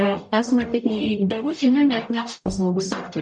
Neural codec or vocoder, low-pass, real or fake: codec, 44.1 kHz, 0.9 kbps, DAC; 10.8 kHz; fake